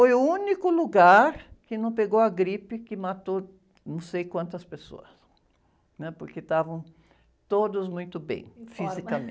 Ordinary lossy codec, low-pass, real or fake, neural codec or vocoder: none; none; real; none